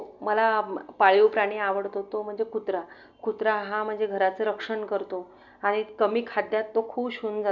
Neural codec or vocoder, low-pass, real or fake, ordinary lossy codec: none; 7.2 kHz; real; none